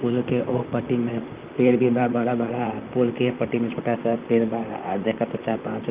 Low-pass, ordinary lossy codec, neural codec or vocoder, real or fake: 3.6 kHz; Opus, 32 kbps; vocoder, 44.1 kHz, 128 mel bands, Pupu-Vocoder; fake